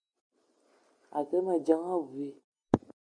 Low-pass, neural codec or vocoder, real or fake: 9.9 kHz; none; real